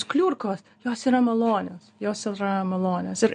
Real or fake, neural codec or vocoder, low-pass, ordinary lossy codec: real; none; 9.9 kHz; MP3, 48 kbps